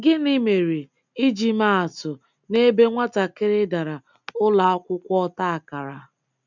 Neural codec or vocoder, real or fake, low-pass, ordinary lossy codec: none; real; 7.2 kHz; none